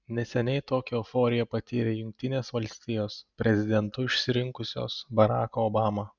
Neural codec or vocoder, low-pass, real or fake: none; 7.2 kHz; real